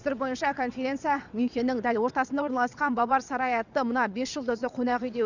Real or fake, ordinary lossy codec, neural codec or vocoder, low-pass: fake; none; vocoder, 22.05 kHz, 80 mel bands, WaveNeXt; 7.2 kHz